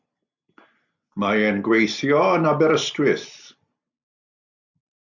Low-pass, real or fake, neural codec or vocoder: 7.2 kHz; real; none